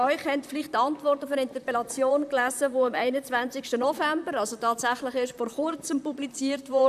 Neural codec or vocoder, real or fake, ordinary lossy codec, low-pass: vocoder, 44.1 kHz, 128 mel bands, Pupu-Vocoder; fake; none; 14.4 kHz